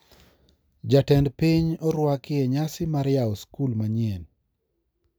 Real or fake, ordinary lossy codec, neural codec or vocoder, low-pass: real; none; none; none